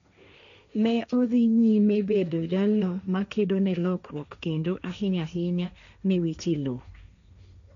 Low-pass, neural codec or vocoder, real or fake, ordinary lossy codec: 7.2 kHz; codec, 16 kHz, 1.1 kbps, Voila-Tokenizer; fake; none